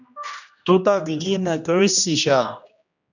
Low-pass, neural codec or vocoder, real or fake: 7.2 kHz; codec, 16 kHz, 1 kbps, X-Codec, HuBERT features, trained on general audio; fake